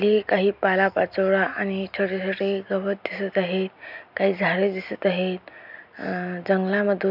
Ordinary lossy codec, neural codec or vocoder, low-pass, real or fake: none; none; 5.4 kHz; real